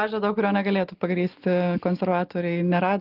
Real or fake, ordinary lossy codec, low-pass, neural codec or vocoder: real; Opus, 32 kbps; 5.4 kHz; none